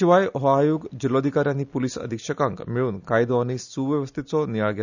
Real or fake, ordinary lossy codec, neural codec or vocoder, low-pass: real; none; none; 7.2 kHz